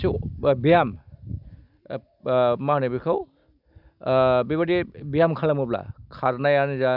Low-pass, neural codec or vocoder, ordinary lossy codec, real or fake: 5.4 kHz; none; none; real